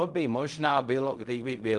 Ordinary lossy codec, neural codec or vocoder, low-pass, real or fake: Opus, 32 kbps; codec, 16 kHz in and 24 kHz out, 0.4 kbps, LongCat-Audio-Codec, fine tuned four codebook decoder; 10.8 kHz; fake